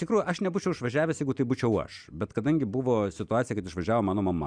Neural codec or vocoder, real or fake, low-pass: none; real; 9.9 kHz